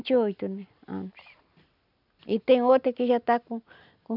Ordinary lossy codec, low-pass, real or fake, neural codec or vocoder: none; 5.4 kHz; real; none